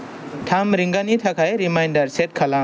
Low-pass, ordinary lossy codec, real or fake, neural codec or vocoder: none; none; real; none